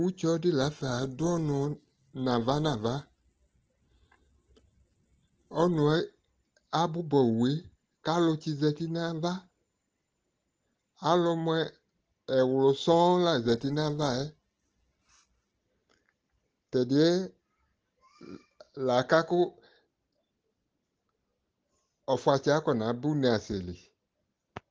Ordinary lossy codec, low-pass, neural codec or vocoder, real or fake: Opus, 16 kbps; 7.2 kHz; none; real